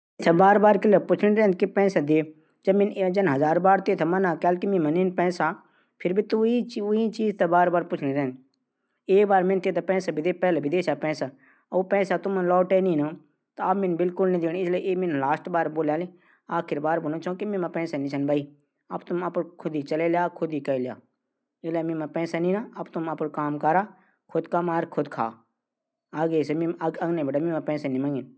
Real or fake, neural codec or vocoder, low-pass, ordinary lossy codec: real; none; none; none